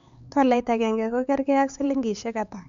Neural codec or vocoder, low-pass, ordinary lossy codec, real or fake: codec, 16 kHz, 4 kbps, X-Codec, HuBERT features, trained on LibriSpeech; 7.2 kHz; Opus, 64 kbps; fake